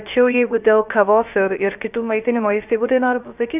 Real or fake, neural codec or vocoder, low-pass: fake; codec, 16 kHz, 0.3 kbps, FocalCodec; 3.6 kHz